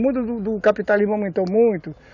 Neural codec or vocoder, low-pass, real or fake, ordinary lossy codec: none; 7.2 kHz; real; none